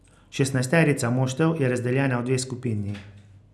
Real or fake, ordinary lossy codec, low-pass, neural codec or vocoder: real; none; none; none